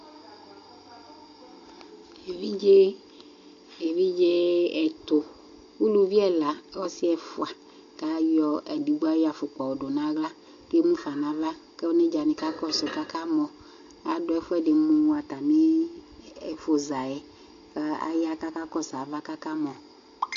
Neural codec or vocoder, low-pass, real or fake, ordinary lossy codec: none; 7.2 kHz; real; MP3, 48 kbps